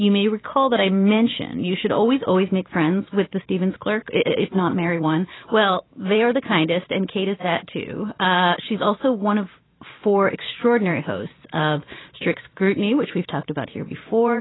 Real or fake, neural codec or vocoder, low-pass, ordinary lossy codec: fake; vocoder, 44.1 kHz, 128 mel bands every 512 samples, BigVGAN v2; 7.2 kHz; AAC, 16 kbps